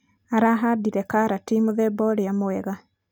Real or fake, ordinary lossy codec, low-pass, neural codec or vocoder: real; none; 19.8 kHz; none